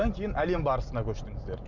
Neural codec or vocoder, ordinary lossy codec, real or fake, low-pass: none; none; real; 7.2 kHz